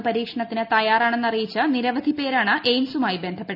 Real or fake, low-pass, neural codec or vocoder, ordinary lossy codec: real; 5.4 kHz; none; none